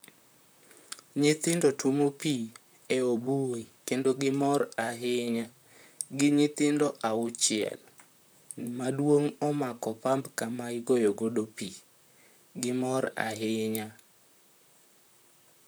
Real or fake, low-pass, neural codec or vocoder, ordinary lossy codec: fake; none; vocoder, 44.1 kHz, 128 mel bands, Pupu-Vocoder; none